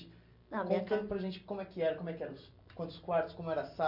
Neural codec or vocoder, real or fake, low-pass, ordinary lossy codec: none; real; 5.4 kHz; MP3, 48 kbps